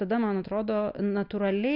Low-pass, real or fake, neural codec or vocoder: 5.4 kHz; real; none